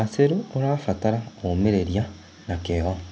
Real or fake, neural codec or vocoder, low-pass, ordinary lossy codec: real; none; none; none